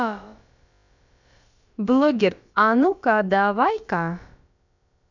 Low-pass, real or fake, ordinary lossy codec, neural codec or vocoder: 7.2 kHz; fake; none; codec, 16 kHz, about 1 kbps, DyCAST, with the encoder's durations